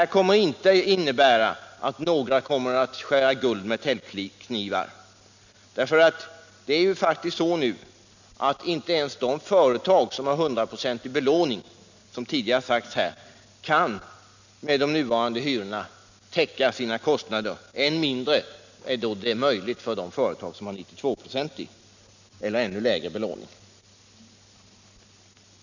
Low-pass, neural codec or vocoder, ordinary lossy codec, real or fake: 7.2 kHz; none; none; real